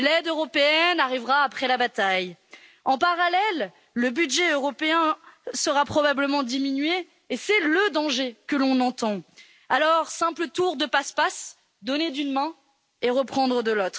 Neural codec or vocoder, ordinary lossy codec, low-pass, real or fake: none; none; none; real